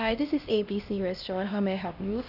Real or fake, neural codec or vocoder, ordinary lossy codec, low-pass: fake; codec, 16 kHz, 1 kbps, X-Codec, HuBERT features, trained on LibriSpeech; none; 5.4 kHz